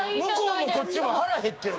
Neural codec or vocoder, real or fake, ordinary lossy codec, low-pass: codec, 16 kHz, 6 kbps, DAC; fake; none; none